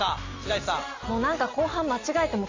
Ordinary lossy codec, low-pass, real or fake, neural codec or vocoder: AAC, 48 kbps; 7.2 kHz; real; none